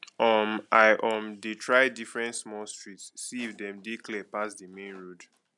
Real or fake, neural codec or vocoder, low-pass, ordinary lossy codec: real; none; 10.8 kHz; none